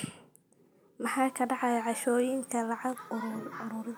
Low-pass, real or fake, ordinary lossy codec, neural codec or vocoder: none; real; none; none